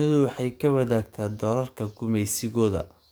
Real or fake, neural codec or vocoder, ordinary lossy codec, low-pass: fake; codec, 44.1 kHz, 7.8 kbps, DAC; none; none